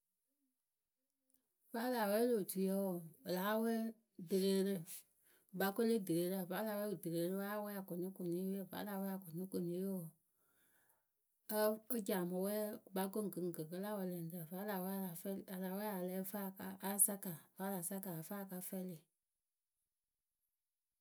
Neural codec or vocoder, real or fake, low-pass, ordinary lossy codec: none; real; none; none